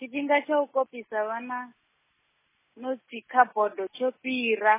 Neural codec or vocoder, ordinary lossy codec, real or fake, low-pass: none; MP3, 16 kbps; real; 3.6 kHz